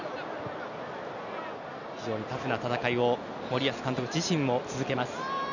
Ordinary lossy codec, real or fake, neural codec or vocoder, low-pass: none; real; none; 7.2 kHz